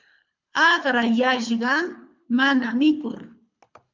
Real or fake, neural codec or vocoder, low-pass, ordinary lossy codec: fake; codec, 24 kHz, 3 kbps, HILCodec; 7.2 kHz; MP3, 64 kbps